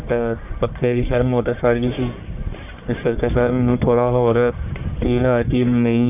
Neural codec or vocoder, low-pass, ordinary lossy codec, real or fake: codec, 44.1 kHz, 1.7 kbps, Pupu-Codec; 3.6 kHz; none; fake